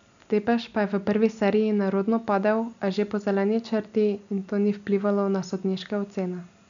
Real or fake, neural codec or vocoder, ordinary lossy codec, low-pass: real; none; none; 7.2 kHz